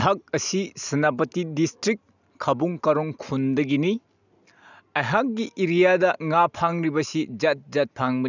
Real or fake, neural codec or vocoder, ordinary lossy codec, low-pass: real; none; none; 7.2 kHz